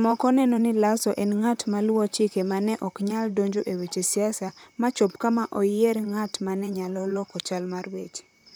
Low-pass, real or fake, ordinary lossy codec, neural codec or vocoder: none; fake; none; vocoder, 44.1 kHz, 128 mel bands, Pupu-Vocoder